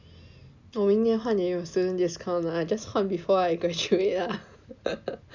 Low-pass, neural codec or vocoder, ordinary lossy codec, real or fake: 7.2 kHz; none; none; real